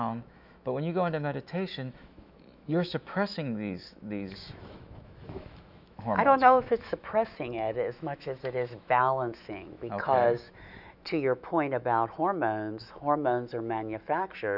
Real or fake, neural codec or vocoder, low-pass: fake; autoencoder, 48 kHz, 128 numbers a frame, DAC-VAE, trained on Japanese speech; 5.4 kHz